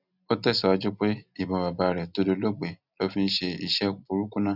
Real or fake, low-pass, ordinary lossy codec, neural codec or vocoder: real; 5.4 kHz; none; none